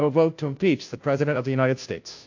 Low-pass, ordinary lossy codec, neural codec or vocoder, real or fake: 7.2 kHz; AAC, 48 kbps; codec, 16 kHz, 0.5 kbps, FunCodec, trained on Chinese and English, 25 frames a second; fake